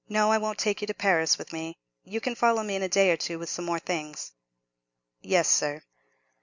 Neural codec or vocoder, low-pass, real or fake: none; 7.2 kHz; real